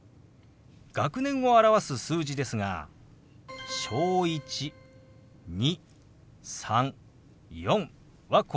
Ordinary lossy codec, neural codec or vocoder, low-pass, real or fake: none; none; none; real